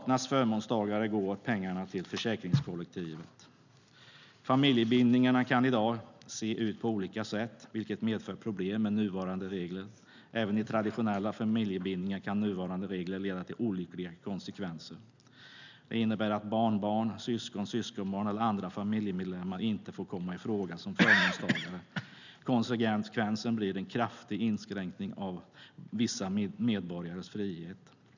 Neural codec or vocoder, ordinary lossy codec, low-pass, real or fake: none; none; 7.2 kHz; real